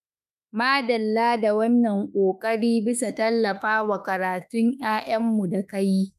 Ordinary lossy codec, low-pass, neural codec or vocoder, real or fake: none; 14.4 kHz; autoencoder, 48 kHz, 32 numbers a frame, DAC-VAE, trained on Japanese speech; fake